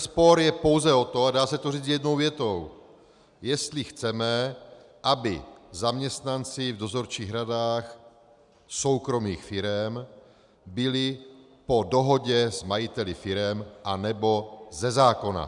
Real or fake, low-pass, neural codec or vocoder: real; 10.8 kHz; none